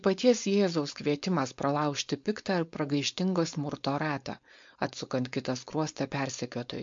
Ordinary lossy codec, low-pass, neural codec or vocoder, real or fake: MP3, 48 kbps; 7.2 kHz; codec, 16 kHz, 4.8 kbps, FACodec; fake